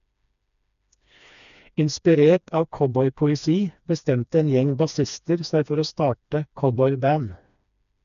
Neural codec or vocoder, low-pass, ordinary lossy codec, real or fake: codec, 16 kHz, 2 kbps, FreqCodec, smaller model; 7.2 kHz; none; fake